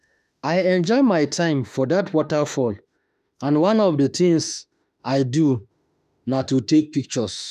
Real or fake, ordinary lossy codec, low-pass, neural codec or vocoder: fake; none; 14.4 kHz; autoencoder, 48 kHz, 32 numbers a frame, DAC-VAE, trained on Japanese speech